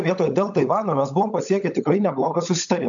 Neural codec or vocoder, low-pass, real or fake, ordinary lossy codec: codec, 16 kHz, 16 kbps, FunCodec, trained on LibriTTS, 50 frames a second; 7.2 kHz; fake; MP3, 64 kbps